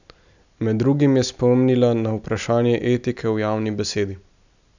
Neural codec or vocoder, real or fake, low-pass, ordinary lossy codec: none; real; 7.2 kHz; none